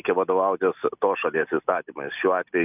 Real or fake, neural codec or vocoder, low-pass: real; none; 3.6 kHz